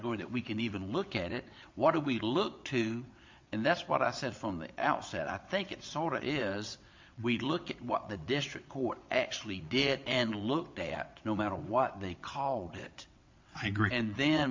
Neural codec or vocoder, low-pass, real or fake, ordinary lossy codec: vocoder, 22.05 kHz, 80 mel bands, WaveNeXt; 7.2 kHz; fake; MP3, 48 kbps